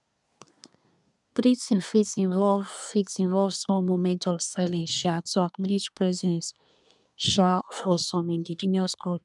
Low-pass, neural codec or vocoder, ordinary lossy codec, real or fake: 10.8 kHz; codec, 24 kHz, 1 kbps, SNAC; none; fake